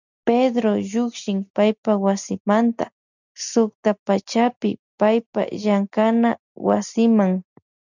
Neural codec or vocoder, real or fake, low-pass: none; real; 7.2 kHz